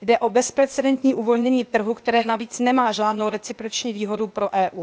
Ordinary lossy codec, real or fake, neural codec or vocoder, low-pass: none; fake; codec, 16 kHz, 0.8 kbps, ZipCodec; none